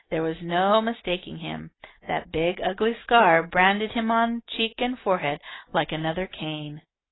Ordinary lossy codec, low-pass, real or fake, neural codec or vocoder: AAC, 16 kbps; 7.2 kHz; real; none